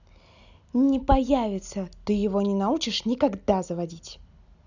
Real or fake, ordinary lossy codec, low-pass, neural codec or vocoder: real; none; 7.2 kHz; none